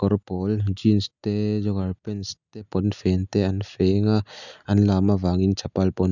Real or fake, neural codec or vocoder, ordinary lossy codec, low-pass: real; none; none; 7.2 kHz